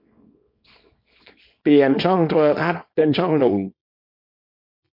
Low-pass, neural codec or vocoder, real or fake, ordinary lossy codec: 5.4 kHz; codec, 24 kHz, 0.9 kbps, WavTokenizer, small release; fake; MP3, 32 kbps